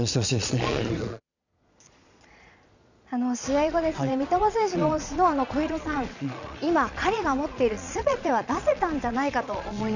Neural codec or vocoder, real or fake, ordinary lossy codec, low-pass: vocoder, 22.05 kHz, 80 mel bands, Vocos; fake; none; 7.2 kHz